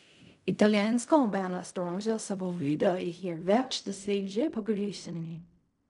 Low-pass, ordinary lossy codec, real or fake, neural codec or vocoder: 10.8 kHz; none; fake; codec, 16 kHz in and 24 kHz out, 0.4 kbps, LongCat-Audio-Codec, fine tuned four codebook decoder